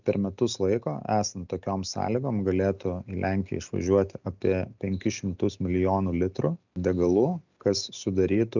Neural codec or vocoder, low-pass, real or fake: none; 7.2 kHz; real